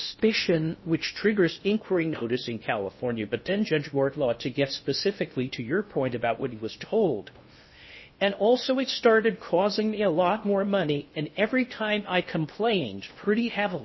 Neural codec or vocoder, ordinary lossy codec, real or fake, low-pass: codec, 16 kHz in and 24 kHz out, 0.6 kbps, FocalCodec, streaming, 2048 codes; MP3, 24 kbps; fake; 7.2 kHz